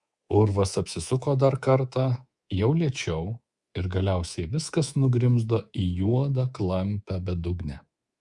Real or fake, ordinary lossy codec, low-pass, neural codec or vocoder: fake; Opus, 64 kbps; 10.8 kHz; codec, 24 kHz, 3.1 kbps, DualCodec